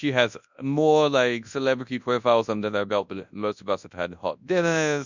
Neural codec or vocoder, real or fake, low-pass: codec, 24 kHz, 0.9 kbps, WavTokenizer, large speech release; fake; 7.2 kHz